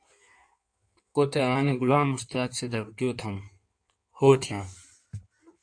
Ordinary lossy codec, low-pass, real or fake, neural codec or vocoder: AAC, 64 kbps; 9.9 kHz; fake; codec, 16 kHz in and 24 kHz out, 1.1 kbps, FireRedTTS-2 codec